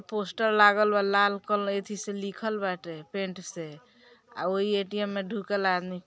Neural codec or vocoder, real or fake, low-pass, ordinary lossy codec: none; real; none; none